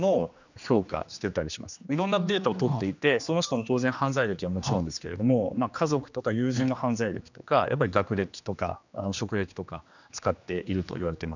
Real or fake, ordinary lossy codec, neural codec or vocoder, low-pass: fake; none; codec, 16 kHz, 2 kbps, X-Codec, HuBERT features, trained on general audio; 7.2 kHz